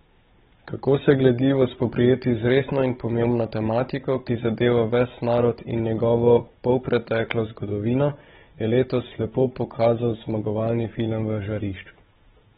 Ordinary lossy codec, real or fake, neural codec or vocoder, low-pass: AAC, 16 kbps; fake; codec, 16 kHz, 16 kbps, FunCodec, trained on Chinese and English, 50 frames a second; 7.2 kHz